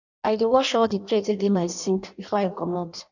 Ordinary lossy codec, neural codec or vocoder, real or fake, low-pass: none; codec, 16 kHz in and 24 kHz out, 0.6 kbps, FireRedTTS-2 codec; fake; 7.2 kHz